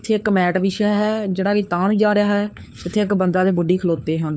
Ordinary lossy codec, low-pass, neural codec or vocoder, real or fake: none; none; codec, 16 kHz, 4 kbps, FunCodec, trained on LibriTTS, 50 frames a second; fake